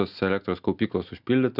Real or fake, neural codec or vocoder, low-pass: real; none; 5.4 kHz